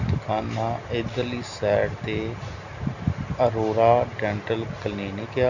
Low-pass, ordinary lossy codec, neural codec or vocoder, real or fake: 7.2 kHz; none; none; real